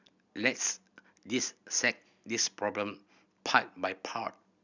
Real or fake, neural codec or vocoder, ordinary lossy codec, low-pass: real; none; none; 7.2 kHz